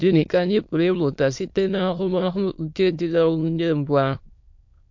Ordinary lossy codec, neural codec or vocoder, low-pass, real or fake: MP3, 48 kbps; autoencoder, 22.05 kHz, a latent of 192 numbers a frame, VITS, trained on many speakers; 7.2 kHz; fake